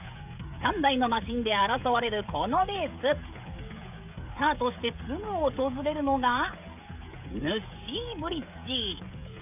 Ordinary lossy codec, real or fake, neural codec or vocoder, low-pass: none; fake; codec, 16 kHz, 16 kbps, FreqCodec, smaller model; 3.6 kHz